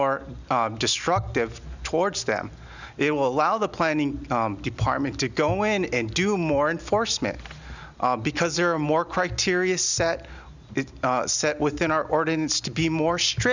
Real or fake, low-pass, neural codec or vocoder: real; 7.2 kHz; none